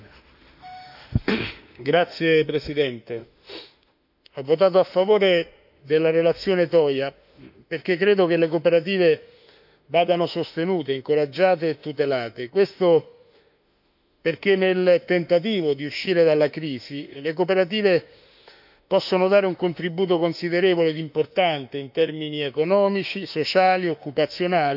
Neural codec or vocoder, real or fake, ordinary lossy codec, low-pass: autoencoder, 48 kHz, 32 numbers a frame, DAC-VAE, trained on Japanese speech; fake; none; 5.4 kHz